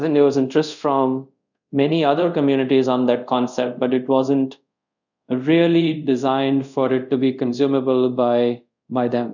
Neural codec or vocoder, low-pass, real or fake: codec, 24 kHz, 0.5 kbps, DualCodec; 7.2 kHz; fake